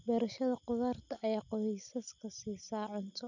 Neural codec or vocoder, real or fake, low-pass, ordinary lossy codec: none; real; 7.2 kHz; none